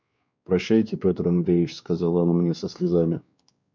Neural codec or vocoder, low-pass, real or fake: codec, 16 kHz, 2 kbps, X-Codec, WavLM features, trained on Multilingual LibriSpeech; 7.2 kHz; fake